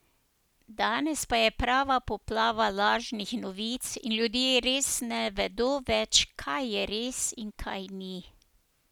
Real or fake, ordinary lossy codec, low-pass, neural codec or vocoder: real; none; none; none